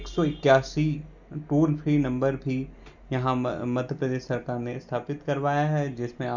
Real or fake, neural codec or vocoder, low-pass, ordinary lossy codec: real; none; 7.2 kHz; none